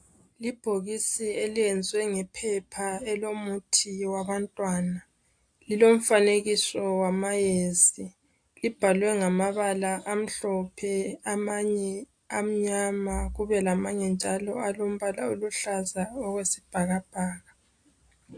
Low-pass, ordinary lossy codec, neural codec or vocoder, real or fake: 9.9 kHz; AAC, 64 kbps; none; real